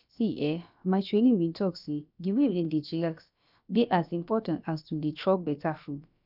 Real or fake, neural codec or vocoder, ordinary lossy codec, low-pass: fake; codec, 16 kHz, about 1 kbps, DyCAST, with the encoder's durations; none; 5.4 kHz